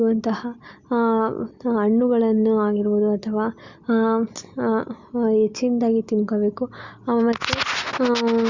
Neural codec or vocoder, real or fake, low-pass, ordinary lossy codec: none; real; 7.2 kHz; Opus, 64 kbps